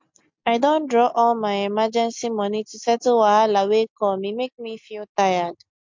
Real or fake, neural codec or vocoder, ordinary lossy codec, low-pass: real; none; MP3, 64 kbps; 7.2 kHz